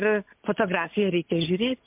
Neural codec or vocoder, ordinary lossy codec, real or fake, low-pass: none; MP3, 32 kbps; real; 3.6 kHz